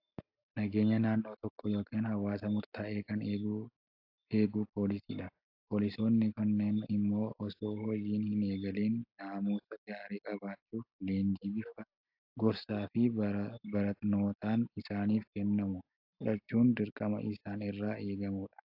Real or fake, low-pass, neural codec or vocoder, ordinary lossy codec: real; 5.4 kHz; none; AAC, 48 kbps